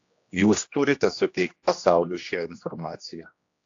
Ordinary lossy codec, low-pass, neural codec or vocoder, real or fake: AAC, 48 kbps; 7.2 kHz; codec, 16 kHz, 1 kbps, X-Codec, HuBERT features, trained on general audio; fake